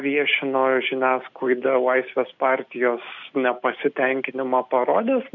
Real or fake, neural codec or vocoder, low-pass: real; none; 7.2 kHz